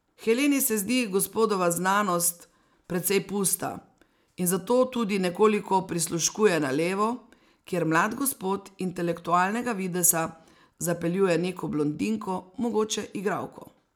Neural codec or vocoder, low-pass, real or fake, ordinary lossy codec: none; none; real; none